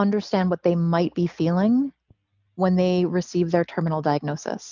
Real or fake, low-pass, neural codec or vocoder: real; 7.2 kHz; none